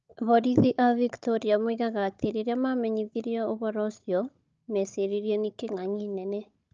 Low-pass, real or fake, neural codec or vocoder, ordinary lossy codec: 7.2 kHz; fake; codec, 16 kHz, 16 kbps, FunCodec, trained on Chinese and English, 50 frames a second; Opus, 24 kbps